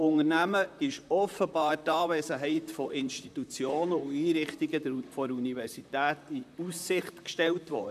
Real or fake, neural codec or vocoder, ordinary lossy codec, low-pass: fake; vocoder, 44.1 kHz, 128 mel bands, Pupu-Vocoder; none; 14.4 kHz